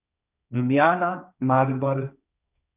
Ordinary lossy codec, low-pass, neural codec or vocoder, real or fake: none; 3.6 kHz; codec, 16 kHz, 1.1 kbps, Voila-Tokenizer; fake